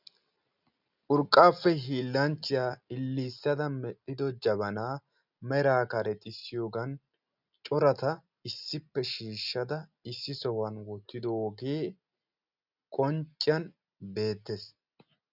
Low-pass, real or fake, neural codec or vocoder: 5.4 kHz; real; none